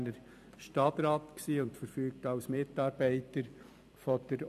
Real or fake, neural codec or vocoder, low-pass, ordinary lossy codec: fake; vocoder, 44.1 kHz, 128 mel bands every 256 samples, BigVGAN v2; 14.4 kHz; none